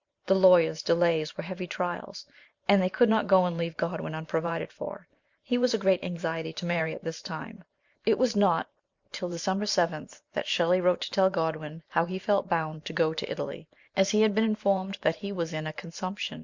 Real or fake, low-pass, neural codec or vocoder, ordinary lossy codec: fake; 7.2 kHz; vocoder, 44.1 kHz, 128 mel bands every 512 samples, BigVGAN v2; Opus, 64 kbps